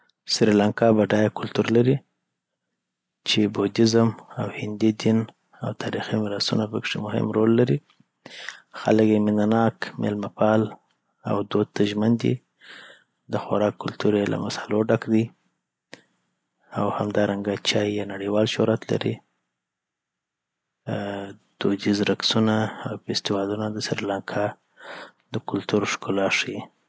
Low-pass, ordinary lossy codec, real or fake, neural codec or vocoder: none; none; real; none